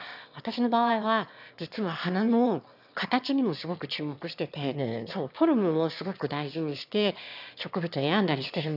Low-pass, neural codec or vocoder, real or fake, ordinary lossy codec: 5.4 kHz; autoencoder, 22.05 kHz, a latent of 192 numbers a frame, VITS, trained on one speaker; fake; none